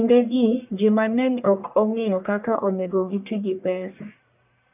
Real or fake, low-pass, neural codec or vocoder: fake; 3.6 kHz; codec, 44.1 kHz, 1.7 kbps, Pupu-Codec